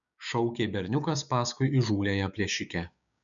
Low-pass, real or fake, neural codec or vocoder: 7.2 kHz; fake; codec, 16 kHz, 6 kbps, DAC